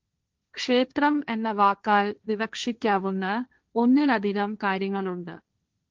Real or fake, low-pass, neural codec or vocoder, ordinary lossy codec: fake; 7.2 kHz; codec, 16 kHz, 1.1 kbps, Voila-Tokenizer; Opus, 32 kbps